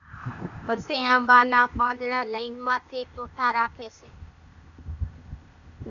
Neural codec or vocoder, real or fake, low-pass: codec, 16 kHz, 0.8 kbps, ZipCodec; fake; 7.2 kHz